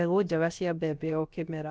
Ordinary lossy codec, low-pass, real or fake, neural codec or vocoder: none; none; fake; codec, 16 kHz, about 1 kbps, DyCAST, with the encoder's durations